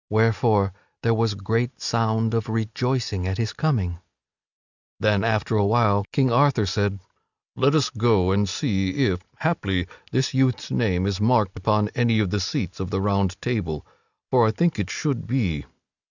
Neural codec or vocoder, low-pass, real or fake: none; 7.2 kHz; real